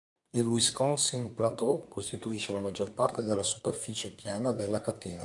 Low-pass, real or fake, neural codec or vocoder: 10.8 kHz; fake; codec, 24 kHz, 1 kbps, SNAC